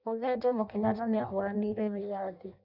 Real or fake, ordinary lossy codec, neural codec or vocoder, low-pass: fake; none; codec, 16 kHz in and 24 kHz out, 0.6 kbps, FireRedTTS-2 codec; 5.4 kHz